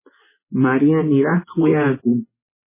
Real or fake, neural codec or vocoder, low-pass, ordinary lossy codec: fake; vocoder, 24 kHz, 100 mel bands, Vocos; 3.6 kHz; MP3, 16 kbps